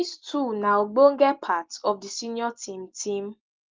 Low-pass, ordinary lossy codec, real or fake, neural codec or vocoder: 7.2 kHz; Opus, 24 kbps; real; none